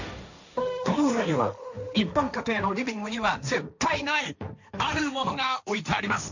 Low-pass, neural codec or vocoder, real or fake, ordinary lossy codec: 7.2 kHz; codec, 16 kHz, 1.1 kbps, Voila-Tokenizer; fake; none